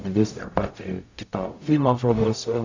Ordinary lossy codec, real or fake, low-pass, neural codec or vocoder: AAC, 48 kbps; fake; 7.2 kHz; codec, 44.1 kHz, 0.9 kbps, DAC